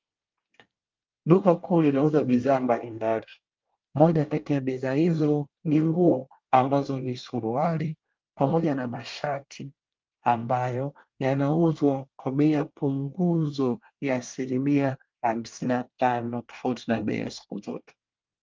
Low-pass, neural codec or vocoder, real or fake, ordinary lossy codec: 7.2 kHz; codec, 24 kHz, 1 kbps, SNAC; fake; Opus, 24 kbps